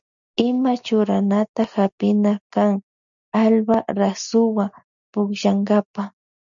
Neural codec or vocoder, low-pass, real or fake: none; 7.2 kHz; real